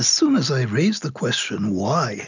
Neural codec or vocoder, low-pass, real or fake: none; 7.2 kHz; real